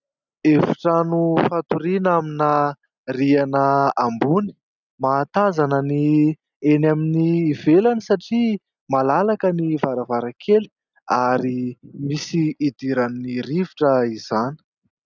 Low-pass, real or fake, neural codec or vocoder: 7.2 kHz; real; none